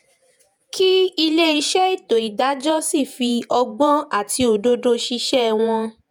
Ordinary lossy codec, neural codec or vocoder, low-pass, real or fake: none; vocoder, 48 kHz, 128 mel bands, Vocos; 19.8 kHz; fake